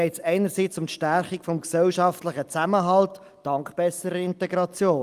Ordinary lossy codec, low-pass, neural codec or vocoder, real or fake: Opus, 32 kbps; 14.4 kHz; none; real